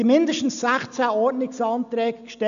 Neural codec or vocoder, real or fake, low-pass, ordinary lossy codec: none; real; 7.2 kHz; none